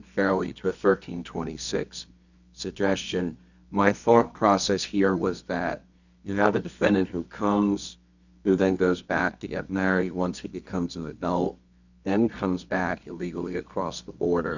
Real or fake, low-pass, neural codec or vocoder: fake; 7.2 kHz; codec, 24 kHz, 0.9 kbps, WavTokenizer, medium music audio release